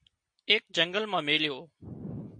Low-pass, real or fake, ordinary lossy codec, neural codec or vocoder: 9.9 kHz; real; MP3, 48 kbps; none